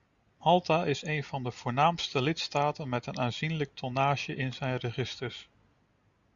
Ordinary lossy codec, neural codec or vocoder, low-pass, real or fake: Opus, 64 kbps; none; 7.2 kHz; real